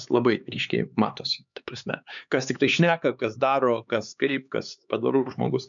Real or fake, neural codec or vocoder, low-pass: fake; codec, 16 kHz, 4 kbps, X-Codec, HuBERT features, trained on LibriSpeech; 7.2 kHz